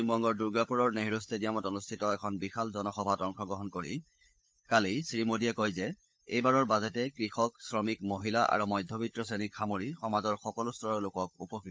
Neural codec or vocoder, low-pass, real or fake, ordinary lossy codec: codec, 16 kHz, 16 kbps, FunCodec, trained on LibriTTS, 50 frames a second; none; fake; none